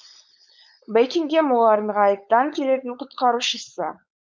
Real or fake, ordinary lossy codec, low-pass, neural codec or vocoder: fake; none; none; codec, 16 kHz, 4.8 kbps, FACodec